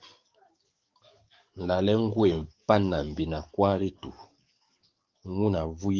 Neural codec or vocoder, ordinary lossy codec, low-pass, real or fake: codec, 44.1 kHz, 7.8 kbps, DAC; Opus, 32 kbps; 7.2 kHz; fake